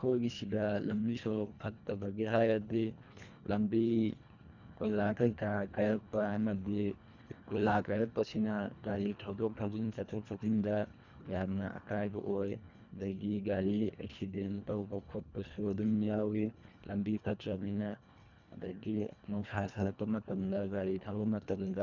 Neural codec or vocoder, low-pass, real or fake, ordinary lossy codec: codec, 24 kHz, 1.5 kbps, HILCodec; 7.2 kHz; fake; none